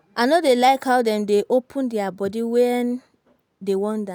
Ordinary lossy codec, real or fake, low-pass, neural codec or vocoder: none; real; none; none